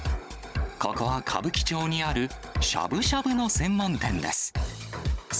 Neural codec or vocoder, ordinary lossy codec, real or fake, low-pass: codec, 16 kHz, 16 kbps, FunCodec, trained on Chinese and English, 50 frames a second; none; fake; none